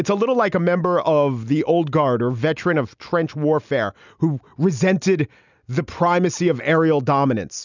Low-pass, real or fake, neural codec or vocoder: 7.2 kHz; real; none